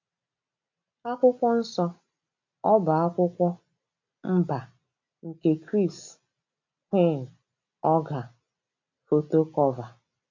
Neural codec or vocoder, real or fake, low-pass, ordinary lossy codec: none; real; 7.2 kHz; MP3, 48 kbps